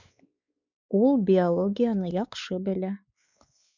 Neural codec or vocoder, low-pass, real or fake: codec, 16 kHz, 4 kbps, X-Codec, WavLM features, trained on Multilingual LibriSpeech; 7.2 kHz; fake